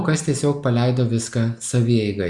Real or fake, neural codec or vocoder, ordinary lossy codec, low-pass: real; none; Opus, 64 kbps; 10.8 kHz